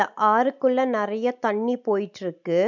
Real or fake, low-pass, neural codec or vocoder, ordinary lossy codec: real; 7.2 kHz; none; none